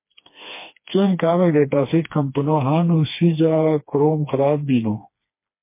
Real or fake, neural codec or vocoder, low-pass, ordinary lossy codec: fake; codec, 16 kHz, 2 kbps, FreqCodec, smaller model; 3.6 kHz; MP3, 24 kbps